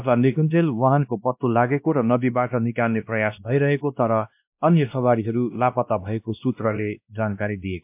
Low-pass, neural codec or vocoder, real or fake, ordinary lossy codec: 3.6 kHz; codec, 16 kHz, 1 kbps, X-Codec, WavLM features, trained on Multilingual LibriSpeech; fake; MP3, 32 kbps